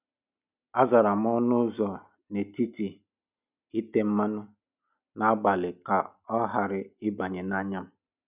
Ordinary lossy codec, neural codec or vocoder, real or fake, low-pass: none; none; real; 3.6 kHz